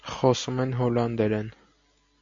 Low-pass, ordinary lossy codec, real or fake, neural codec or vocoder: 7.2 kHz; MP3, 48 kbps; real; none